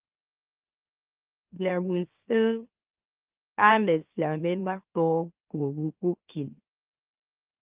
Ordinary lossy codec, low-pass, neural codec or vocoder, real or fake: Opus, 32 kbps; 3.6 kHz; autoencoder, 44.1 kHz, a latent of 192 numbers a frame, MeloTTS; fake